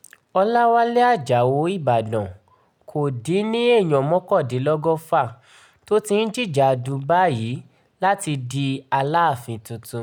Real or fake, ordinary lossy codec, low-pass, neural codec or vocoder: real; none; 19.8 kHz; none